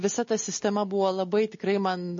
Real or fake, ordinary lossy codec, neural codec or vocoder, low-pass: real; MP3, 32 kbps; none; 7.2 kHz